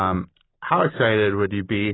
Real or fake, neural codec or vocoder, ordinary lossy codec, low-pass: real; none; AAC, 16 kbps; 7.2 kHz